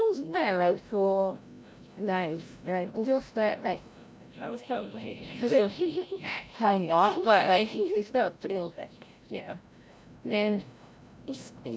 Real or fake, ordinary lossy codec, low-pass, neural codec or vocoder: fake; none; none; codec, 16 kHz, 0.5 kbps, FreqCodec, larger model